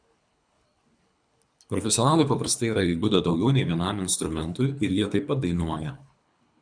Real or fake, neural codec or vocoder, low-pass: fake; codec, 24 kHz, 3 kbps, HILCodec; 9.9 kHz